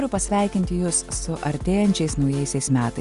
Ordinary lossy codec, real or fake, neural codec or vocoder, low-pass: Opus, 64 kbps; real; none; 10.8 kHz